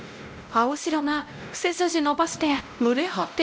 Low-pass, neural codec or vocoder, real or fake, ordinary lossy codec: none; codec, 16 kHz, 0.5 kbps, X-Codec, WavLM features, trained on Multilingual LibriSpeech; fake; none